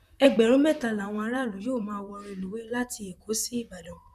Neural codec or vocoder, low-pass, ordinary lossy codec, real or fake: vocoder, 44.1 kHz, 128 mel bands, Pupu-Vocoder; 14.4 kHz; none; fake